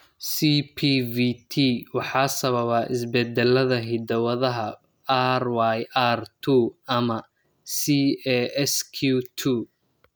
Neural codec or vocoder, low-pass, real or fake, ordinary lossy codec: none; none; real; none